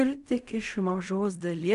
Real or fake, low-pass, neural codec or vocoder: fake; 10.8 kHz; codec, 16 kHz in and 24 kHz out, 0.4 kbps, LongCat-Audio-Codec, fine tuned four codebook decoder